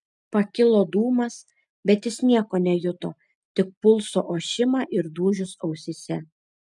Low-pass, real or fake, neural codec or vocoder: 10.8 kHz; real; none